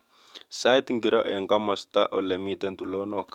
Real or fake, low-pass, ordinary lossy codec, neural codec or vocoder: fake; 19.8 kHz; MP3, 96 kbps; codec, 44.1 kHz, 7.8 kbps, DAC